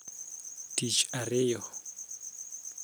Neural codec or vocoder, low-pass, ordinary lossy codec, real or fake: none; none; none; real